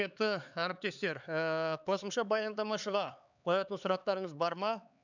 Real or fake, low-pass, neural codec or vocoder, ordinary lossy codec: fake; 7.2 kHz; codec, 16 kHz, 4 kbps, X-Codec, HuBERT features, trained on LibriSpeech; none